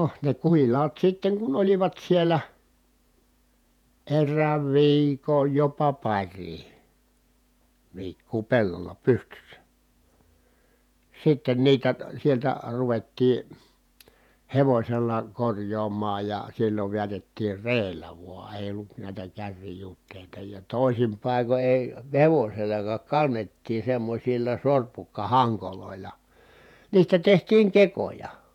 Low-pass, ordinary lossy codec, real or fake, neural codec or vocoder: 19.8 kHz; none; fake; vocoder, 48 kHz, 128 mel bands, Vocos